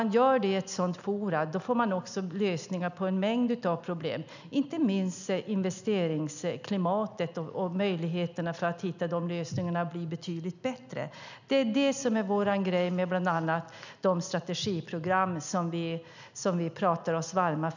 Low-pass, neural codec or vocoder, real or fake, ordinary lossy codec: 7.2 kHz; none; real; none